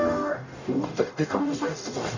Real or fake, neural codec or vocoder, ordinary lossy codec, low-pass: fake; codec, 44.1 kHz, 0.9 kbps, DAC; none; 7.2 kHz